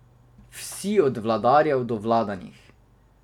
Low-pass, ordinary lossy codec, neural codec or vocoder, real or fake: 19.8 kHz; none; vocoder, 44.1 kHz, 128 mel bands every 256 samples, BigVGAN v2; fake